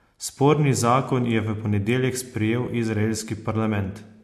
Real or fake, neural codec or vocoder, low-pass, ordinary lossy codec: real; none; 14.4 kHz; MP3, 64 kbps